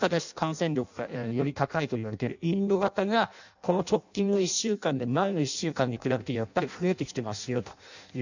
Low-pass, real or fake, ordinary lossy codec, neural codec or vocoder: 7.2 kHz; fake; none; codec, 16 kHz in and 24 kHz out, 0.6 kbps, FireRedTTS-2 codec